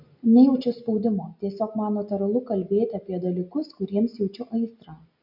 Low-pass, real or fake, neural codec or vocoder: 5.4 kHz; real; none